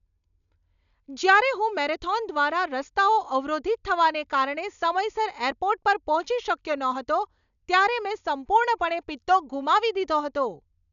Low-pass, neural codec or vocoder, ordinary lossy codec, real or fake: 7.2 kHz; none; none; real